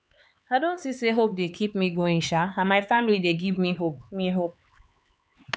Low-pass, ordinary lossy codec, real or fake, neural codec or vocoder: none; none; fake; codec, 16 kHz, 4 kbps, X-Codec, HuBERT features, trained on LibriSpeech